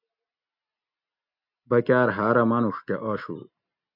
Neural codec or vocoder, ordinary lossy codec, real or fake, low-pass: none; MP3, 48 kbps; real; 5.4 kHz